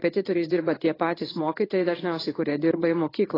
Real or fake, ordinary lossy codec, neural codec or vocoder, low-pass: fake; AAC, 24 kbps; codec, 16 kHz in and 24 kHz out, 1 kbps, XY-Tokenizer; 5.4 kHz